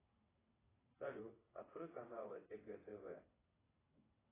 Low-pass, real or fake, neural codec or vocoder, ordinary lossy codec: 3.6 kHz; fake; vocoder, 22.05 kHz, 80 mel bands, WaveNeXt; AAC, 16 kbps